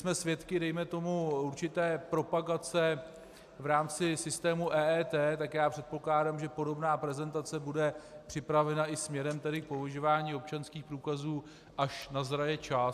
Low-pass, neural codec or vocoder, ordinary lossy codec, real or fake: 14.4 kHz; none; AAC, 96 kbps; real